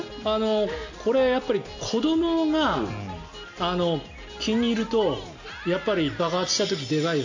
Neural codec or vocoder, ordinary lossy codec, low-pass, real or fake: none; AAC, 48 kbps; 7.2 kHz; real